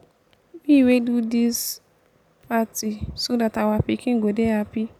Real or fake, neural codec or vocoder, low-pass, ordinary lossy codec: real; none; 19.8 kHz; none